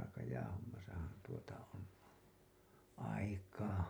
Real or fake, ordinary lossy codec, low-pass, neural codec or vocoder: real; none; none; none